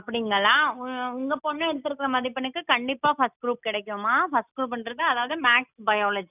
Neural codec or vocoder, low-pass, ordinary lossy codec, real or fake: none; 3.6 kHz; none; real